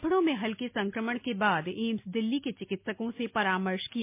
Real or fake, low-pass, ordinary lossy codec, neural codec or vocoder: real; 3.6 kHz; MP3, 24 kbps; none